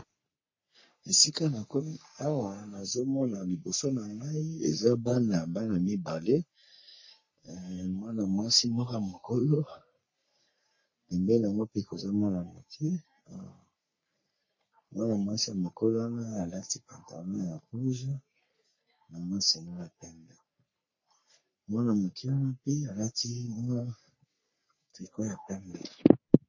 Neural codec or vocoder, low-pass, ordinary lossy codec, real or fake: codec, 44.1 kHz, 3.4 kbps, Pupu-Codec; 7.2 kHz; MP3, 32 kbps; fake